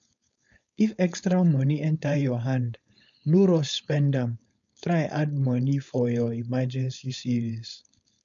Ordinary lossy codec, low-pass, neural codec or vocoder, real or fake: none; 7.2 kHz; codec, 16 kHz, 4.8 kbps, FACodec; fake